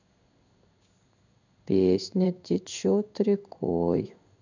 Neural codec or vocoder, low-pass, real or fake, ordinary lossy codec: codec, 16 kHz in and 24 kHz out, 1 kbps, XY-Tokenizer; 7.2 kHz; fake; none